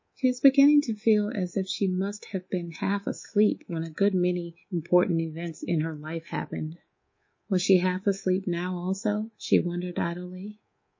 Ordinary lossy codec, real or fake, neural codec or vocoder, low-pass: MP3, 32 kbps; fake; codec, 24 kHz, 3.1 kbps, DualCodec; 7.2 kHz